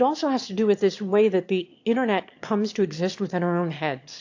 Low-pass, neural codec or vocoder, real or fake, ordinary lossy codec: 7.2 kHz; autoencoder, 22.05 kHz, a latent of 192 numbers a frame, VITS, trained on one speaker; fake; AAC, 48 kbps